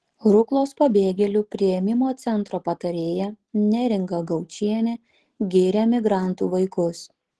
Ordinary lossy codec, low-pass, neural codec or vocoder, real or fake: Opus, 16 kbps; 10.8 kHz; none; real